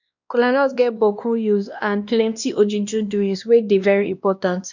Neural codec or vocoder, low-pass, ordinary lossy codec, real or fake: codec, 16 kHz, 1 kbps, X-Codec, WavLM features, trained on Multilingual LibriSpeech; 7.2 kHz; none; fake